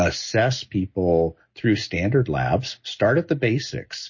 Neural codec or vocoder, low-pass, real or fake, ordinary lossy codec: none; 7.2 kHz; real; MP3, 32 kbps